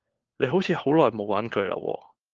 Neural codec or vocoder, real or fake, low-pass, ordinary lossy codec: codec, 16 kHz, 4 kbps, FunCodec, trained on LibriTTS, 50 frames a second; fake; 7.2 kHz; Opus, 16 kbps